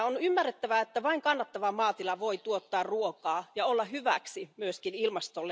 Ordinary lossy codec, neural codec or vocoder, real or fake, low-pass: none; none; real; none